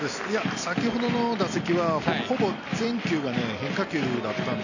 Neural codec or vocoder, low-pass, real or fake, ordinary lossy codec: none; 7.2 kHz; real; none